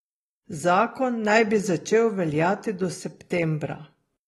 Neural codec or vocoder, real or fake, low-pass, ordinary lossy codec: none; real; 19.8 kHz; AAC, 32 kbps